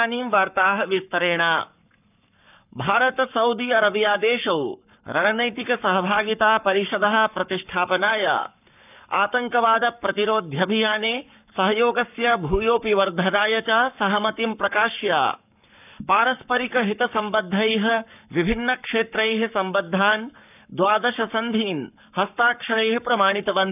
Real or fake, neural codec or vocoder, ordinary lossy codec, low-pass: fake; codec, 44.1 kHz, 7.8 kbps, Pupu-Codec; none; 3.6 kHz